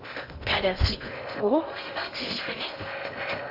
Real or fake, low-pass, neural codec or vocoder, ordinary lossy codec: fake; 5.4 kHz; codec, 16 kHz in and 24 kHz out, 0.6 kbps, FocalCodec, streaming, 2048 codes; AAC, 48 kbps